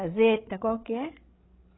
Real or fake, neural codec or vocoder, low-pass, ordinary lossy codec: fake; codec, 16 kHz, 16 kbps, FreqCodec, larger model; 7.2 kHz; AAC, 16 kbps